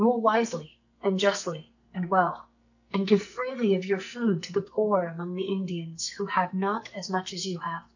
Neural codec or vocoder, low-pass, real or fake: codec, 44.1 kHz, 2.6 kbps, SNAC; 7.2 kHz; fake